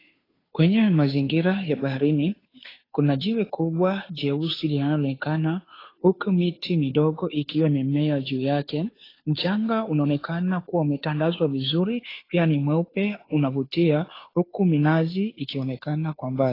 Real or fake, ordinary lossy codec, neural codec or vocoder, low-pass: fake; AAC, 24 kbps; codec, 16 kHz, 2 kbps, FunCodec, trained on Chinese and English, 25 frames a second; 5.4 kHz